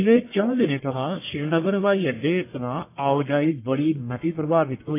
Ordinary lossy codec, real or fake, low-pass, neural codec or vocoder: AAC, 24 kbps; fake; 3.6 kHz; codec, 44.1 kHz, 1.7 kbps, Pupu-Codec